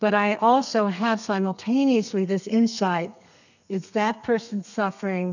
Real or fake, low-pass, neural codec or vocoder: fake; 7.2 kHz; codec, 32 kHz, 1.9 kbps, SNAC